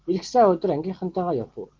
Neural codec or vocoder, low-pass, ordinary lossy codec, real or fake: none; 7.2 kHz; Opus, 16 kbps; real